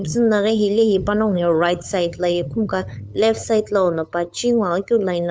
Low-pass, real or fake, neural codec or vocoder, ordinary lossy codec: none; fake; codec, 16 kHz, 8 kbps, FunCodec, trained on LibriTTS, 25 frames a second; none